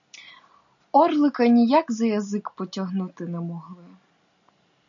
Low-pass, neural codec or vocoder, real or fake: 7.2 kHz; none; real